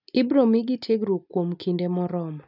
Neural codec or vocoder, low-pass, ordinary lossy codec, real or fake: none; 5.4 kHz; none; real